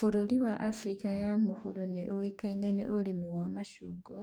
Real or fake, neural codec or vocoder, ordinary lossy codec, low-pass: fake; codec, 44.1 kHz, 2.6 kbps, DAC; none; none